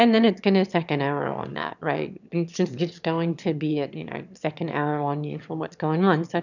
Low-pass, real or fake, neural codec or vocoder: 7.2 kHz; fake; autoencoder, 22.05 kHz, a latent of 192 numbers a frame, VITS, trained on one speaker